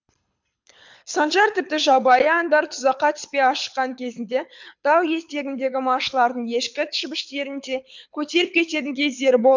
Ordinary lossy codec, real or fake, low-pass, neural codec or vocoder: MP3, 64 kbps; fake; 7.2 kHz; codec, 24 kHz, 6 kbps, HILCodec